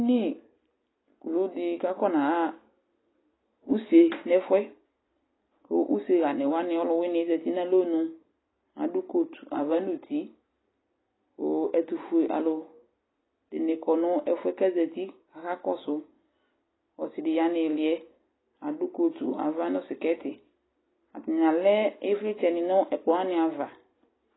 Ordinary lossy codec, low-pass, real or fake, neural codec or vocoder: AAC, 16 kbps; 7.2 kHz; real; none